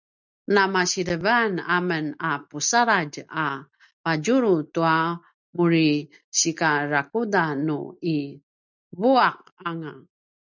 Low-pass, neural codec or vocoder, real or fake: 7.2 kHz; none; real